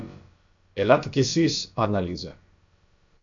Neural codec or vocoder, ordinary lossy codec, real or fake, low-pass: codec, 16 kHz, about 1 kbps, DyCAST, with the encoder's durations; AAC, 64 kbps; fake; 7.2 kHz